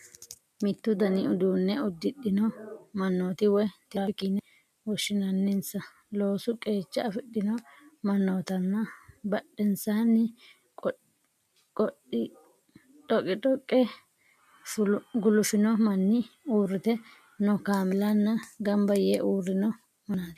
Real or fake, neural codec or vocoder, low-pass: real; none; 14.4 kHz